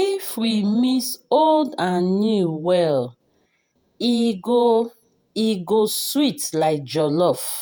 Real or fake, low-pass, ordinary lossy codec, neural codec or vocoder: fake; none; none; vocoder, 48 kHz, 128 mel bands, Vocos